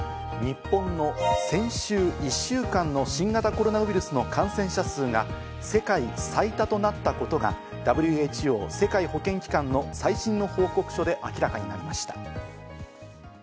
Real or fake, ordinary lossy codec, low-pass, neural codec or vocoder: real; none; none; none